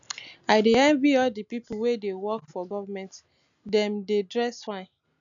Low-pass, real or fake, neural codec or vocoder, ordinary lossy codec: 7.2 kHz; real; none; none